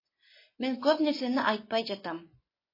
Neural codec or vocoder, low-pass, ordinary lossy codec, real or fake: vocoder, 24 kHz, 100 mel bands, Vocos; 5.4 kHz; MP3, 24 kbps; fake